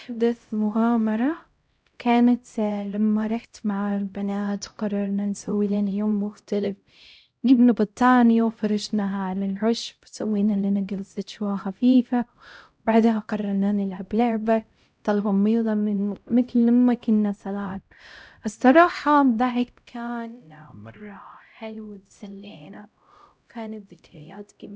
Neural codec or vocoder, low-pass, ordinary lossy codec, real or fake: codec, 16 kHz, 0.5 kbps, X-Codec, HuBERT features, trained on LibriSpeech; none; none; fake